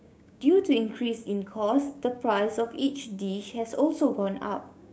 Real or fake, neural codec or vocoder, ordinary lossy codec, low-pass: fake; codec, 16 kHz, 6 kbps, DAC; none; none